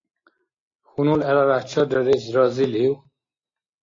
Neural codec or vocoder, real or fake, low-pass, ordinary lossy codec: none; real; 7.2 kHz; AAC, 32 kbps